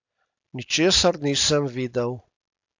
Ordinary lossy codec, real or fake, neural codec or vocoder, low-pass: AAC, 48 kbps; real; none; 7.2 kHz